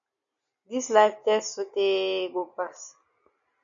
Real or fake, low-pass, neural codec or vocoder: real; 7.2 kHz; none